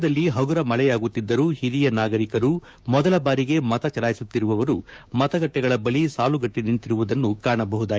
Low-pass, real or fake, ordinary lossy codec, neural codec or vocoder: none; fake; none; codec, 16 kHz, 6 kbps, DAC